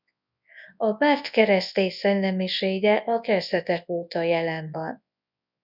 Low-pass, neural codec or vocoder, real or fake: 5.4 kHz; codec, 24 kHz, 0.9 kbps, WavTokenizer, large speech release; fake